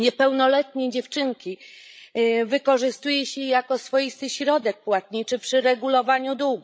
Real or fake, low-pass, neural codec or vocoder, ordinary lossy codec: fake; none; codec, 16 kHz, 16 kbps, FreqCodec, larger model; none